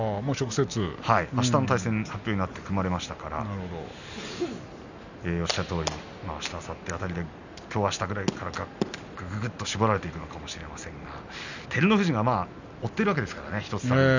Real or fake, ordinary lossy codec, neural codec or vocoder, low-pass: real; none; none; 7.2 kHz